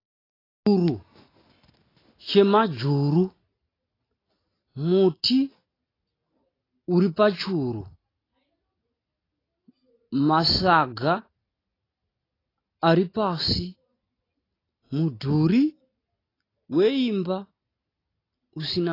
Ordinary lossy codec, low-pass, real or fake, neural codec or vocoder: AAC, 24 kbps; 5.4 kHz; real; none